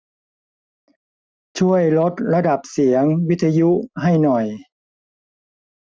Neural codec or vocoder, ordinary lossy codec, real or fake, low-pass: none; none; real; none